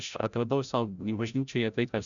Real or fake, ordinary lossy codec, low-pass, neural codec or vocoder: fake; MP3, 96 kbps; 7.2 kHz; codec, 16 kHz, 0.5 kbps, FreqCodec, larger model